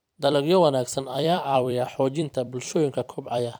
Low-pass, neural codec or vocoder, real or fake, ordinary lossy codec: none; vocoder, 44.1 kHz, 128 mel bands every 256 samples, BigVGAN v2; fake; none